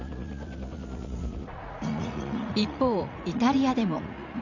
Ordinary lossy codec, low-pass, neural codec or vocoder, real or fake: none; 7.2 kHz; vocoder, 44.1 kHz, 80 mel bands, Vocos; fake